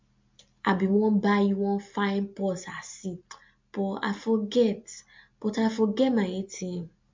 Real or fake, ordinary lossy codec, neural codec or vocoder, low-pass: real; MP3, 48 kbps; none; 7.2 kHz